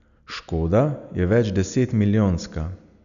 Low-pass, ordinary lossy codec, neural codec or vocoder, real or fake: 7.2 kHz; none; none; real